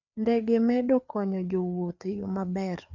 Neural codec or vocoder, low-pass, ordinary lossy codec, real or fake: codec, 16 kHz, 4 kbps, FreqCodec, larger model; 7.2 kHz; none; fake